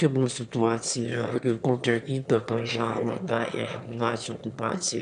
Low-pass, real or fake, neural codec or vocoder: 9.9 kHz; fake; autoencoder, 22.05 kHz, a latent of 192 numbers a frame, VITS, trained on one speaker